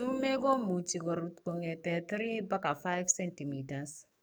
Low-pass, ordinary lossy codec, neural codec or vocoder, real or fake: none; none; codec, 44.1 kHz, 7.8 kbps, DAC; fake